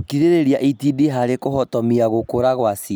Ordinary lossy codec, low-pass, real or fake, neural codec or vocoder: none; none; real; none